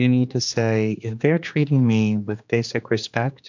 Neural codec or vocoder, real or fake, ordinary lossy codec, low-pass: codec, 16 kHz, 2 kbps, X-Codec, HuBERT features, trained on general audio; fake; MP3, 64 kbps; 7.2 kHz